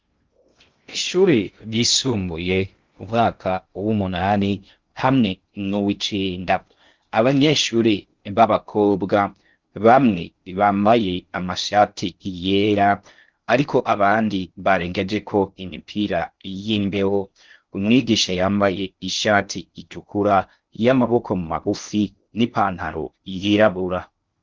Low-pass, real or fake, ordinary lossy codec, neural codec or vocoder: 7.2 kHz; fake; Opus, 32 kbps; codec, 16 kHz in and 24 kHz out, 0.6 kbps, FocalCodec, streaming, 2048 codes